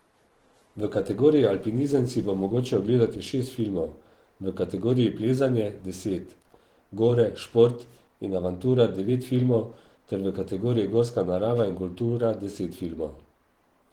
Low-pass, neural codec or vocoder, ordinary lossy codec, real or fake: 19.8 kHz; vocoder, 44.1 kHz, 128 mel bands every 512 samples, BigVGAN v2; Opus, 16 kbps; fake